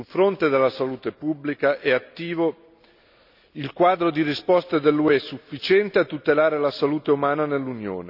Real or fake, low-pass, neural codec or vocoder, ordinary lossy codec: real; 5.4 kHz; none; none